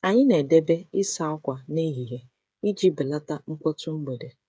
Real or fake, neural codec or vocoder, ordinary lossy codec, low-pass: fake; codec, 16 kHz, 8 kbps, FreqCodec, smaller model; none; none